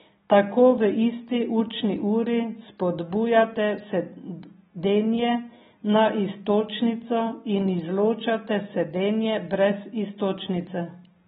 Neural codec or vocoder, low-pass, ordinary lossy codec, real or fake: none; 19.8 kHz; AAC, 16 kbps; real